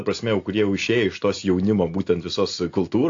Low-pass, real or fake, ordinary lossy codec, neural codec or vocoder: 7.2 kHz; real; AAC, 48 kbps; none